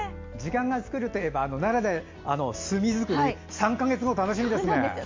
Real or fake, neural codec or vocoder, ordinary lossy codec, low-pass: real; none; MP3, 48 kbps; 7.2 kHz